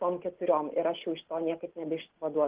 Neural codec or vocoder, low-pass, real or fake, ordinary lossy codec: none; 3.6 kHz; real; Opus, 16 kbps